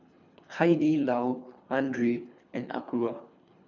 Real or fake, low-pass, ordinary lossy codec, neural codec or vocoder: fake; 7.2 kHz; none; codec, 24 kHz, 3 kbps, HILCodec